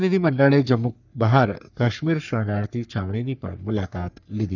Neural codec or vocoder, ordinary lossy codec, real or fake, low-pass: codec, 44.1 kHz, 3.4 kbps, Pupu-Codec; none; fake; 7.2 kHz